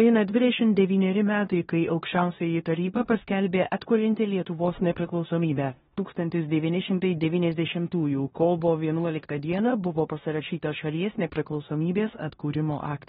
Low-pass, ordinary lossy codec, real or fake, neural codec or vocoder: 10.8 kHz; AAC, 16 kbps; fake; codec, 16 kHz in and 24 kHz out, 0.9 kbps, LongCat-Audio-Codec, four codebook decoder